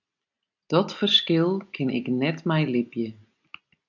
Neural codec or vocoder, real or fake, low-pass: none; real; 7.2 kHz